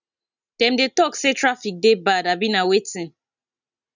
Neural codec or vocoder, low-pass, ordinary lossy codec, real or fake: none; 7.2 kHz; none; real